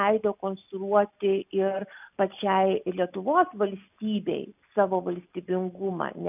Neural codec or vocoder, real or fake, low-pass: none; real; 3.6 kHz